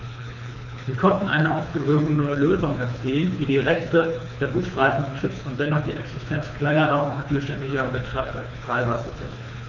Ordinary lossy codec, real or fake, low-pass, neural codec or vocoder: none; fake; 7.2 kHz; codec, 24 kHz, 3 kbps, HILCodec